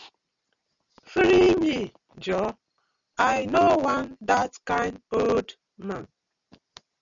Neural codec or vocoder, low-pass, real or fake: none; 7.2 kHz; real